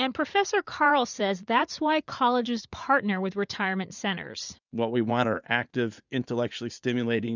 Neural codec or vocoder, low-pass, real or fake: vocoder, 44.1 kHz, 80 mel bands, Vocos; 7.2 kHz; fake